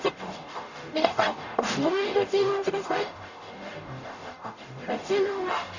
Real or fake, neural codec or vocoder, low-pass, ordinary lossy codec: fake; codec, 44.1 kHz, 0.9 kbps, DAC; 7.2 kHz; none